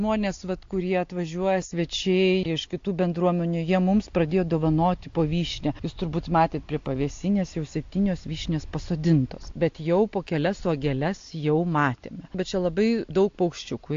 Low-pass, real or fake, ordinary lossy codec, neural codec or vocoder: 7.2 kHz; real; AAC, 48 kbps; none